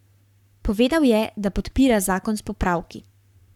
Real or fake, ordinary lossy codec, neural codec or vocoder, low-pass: fake; none; codec, 44.1 kHz, 7.8 kbps, Pupu-Codec; 19.8 kHz